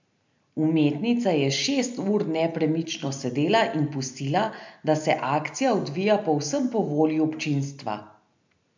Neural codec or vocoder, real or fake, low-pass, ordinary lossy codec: none; real; 7.2 kHz; MP3, 64 kbps